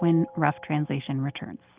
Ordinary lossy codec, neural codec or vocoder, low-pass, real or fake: Opus, 24 kbps; none; 3.6 kHz; real